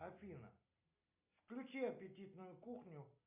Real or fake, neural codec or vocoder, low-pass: real; none; 3.6 kHz